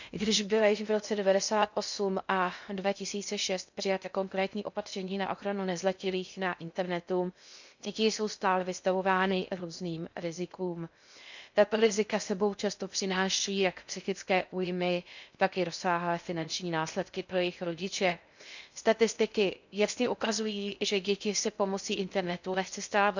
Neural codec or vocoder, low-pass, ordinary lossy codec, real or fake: codec, 16 kHz in and 24 kHz out, 0.6 kbps, FocalCodec, streaming, 2048 codes; 7.2 kHz; none; fake